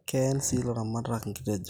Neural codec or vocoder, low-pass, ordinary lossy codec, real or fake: none; none; none; real